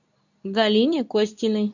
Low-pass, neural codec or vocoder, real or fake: 7.2 kHz; codec, 24 kHz, 0.9 kbps, WavTokenizer, medium speech release version 2; fake